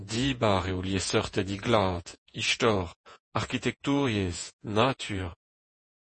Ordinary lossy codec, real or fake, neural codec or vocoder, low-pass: MP3, 32 kbps; fake; vocoder, 48 kHz, 128 mel bands, Vocos; 10.8 kHz